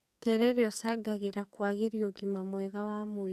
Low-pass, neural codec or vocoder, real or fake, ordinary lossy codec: 14.4 kHz; codec, 44.1 kHz, 2.6 kbps, SNAC; fake; none